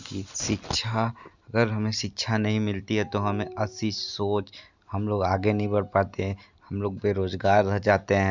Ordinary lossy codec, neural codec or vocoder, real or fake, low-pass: Opus, 64 kbps; none; real; 7.2 kHz